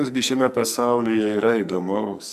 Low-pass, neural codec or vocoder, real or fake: 14.4 kHz; codec, 44.1 kHz, 2.6 kbps, SNAC; fake